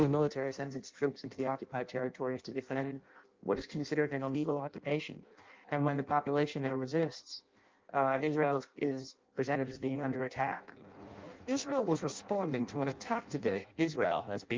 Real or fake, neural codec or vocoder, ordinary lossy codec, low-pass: fake; codec, 16 kHz in and 24 kHz out, 0.6 kbps, FireRedTTS-2 codec; Opus, 32 kbps; 7.2 kHz